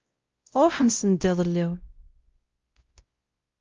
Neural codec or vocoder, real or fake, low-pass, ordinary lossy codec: codec, 16 kHz, 0.5 kbps, X-Codec, WavLM features, trained on Multilingual LibriSpeech; fake; 7.2 kHz; Opus, 32 kbps